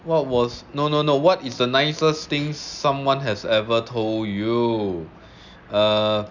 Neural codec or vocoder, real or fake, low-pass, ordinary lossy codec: none; real; 7.2 kHz; none